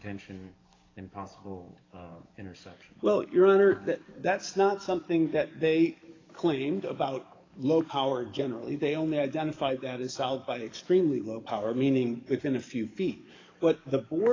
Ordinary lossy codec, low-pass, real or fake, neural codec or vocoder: AAC, 32 kbps; 7.2 kHz; fake; codec, 44.1 kHz, 7.8 kbps, DAC